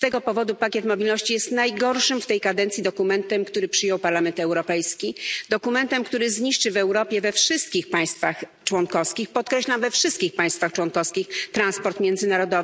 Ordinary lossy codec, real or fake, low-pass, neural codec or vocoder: none; real; none; none